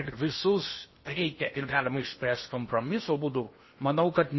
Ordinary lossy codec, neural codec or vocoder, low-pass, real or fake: MP3, 24 kbps; codec, 16 kHz in and 24 kHz out, 0.8 kbps, FocalCodec, streaming, 65536 codes; 7.2 kHz; fake